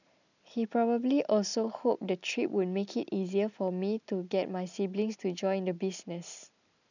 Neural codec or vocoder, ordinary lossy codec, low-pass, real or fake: none; none; 7.2 kHz; real